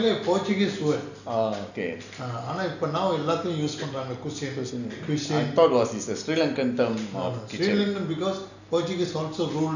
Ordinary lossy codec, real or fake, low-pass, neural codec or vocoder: none; real; 7.2 kHz; none